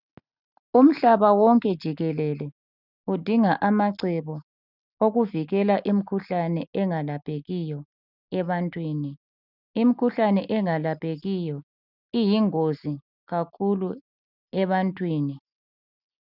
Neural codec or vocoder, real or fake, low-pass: none; real; 5.4 kHz